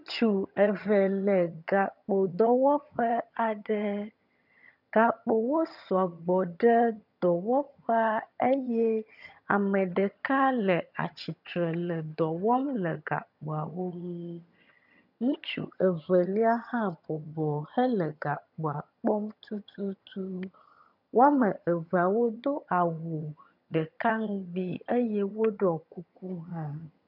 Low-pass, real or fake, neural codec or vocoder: 5.4 kHz; fake; vocoder, 22.05 kHz, 80 mel bands, HiFi-GAN